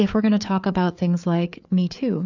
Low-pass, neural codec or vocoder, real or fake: 7.2 kHz; codec, 16 kHz, 4 kbps, FreqCodec, larger model; fake